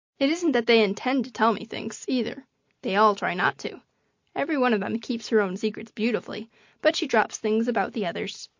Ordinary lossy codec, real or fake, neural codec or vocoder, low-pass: MP3, 48 kbps; real; none; 7.2 kHz